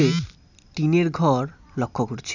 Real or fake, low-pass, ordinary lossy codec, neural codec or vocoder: real; 7.2 kHz; none; none